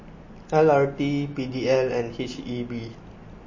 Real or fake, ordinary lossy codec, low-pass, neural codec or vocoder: real; MP3, 32 kbps; 7.2 kHz; none